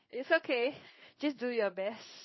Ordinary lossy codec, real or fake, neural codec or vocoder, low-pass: MP3, 24 kbps; fake; codec, 16 kHz in and 24 kHz out, 1 kbps, XY-Tokenizer; 7.2 kHz